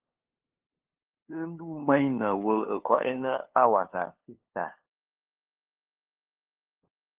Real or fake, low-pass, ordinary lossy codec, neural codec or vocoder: fake; 3.6 kHz; Opus, 16 kbps; codec, 16 kHz, 2 kbps, FunCodec, trained on LibriTTS, 25 frames a second